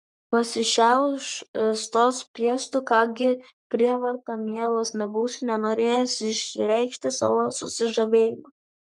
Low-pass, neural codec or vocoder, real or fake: 10.8 kHz; codec, 44.1 kHz, 3.4 kbps, Pupu-Codec; fake